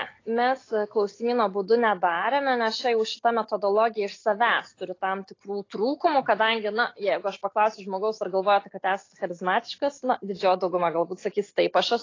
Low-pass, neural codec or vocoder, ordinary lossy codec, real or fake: 7.2 kHz; none; AAC, 32 kbps; real